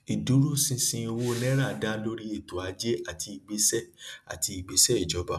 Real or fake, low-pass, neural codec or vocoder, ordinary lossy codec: real; none; none; none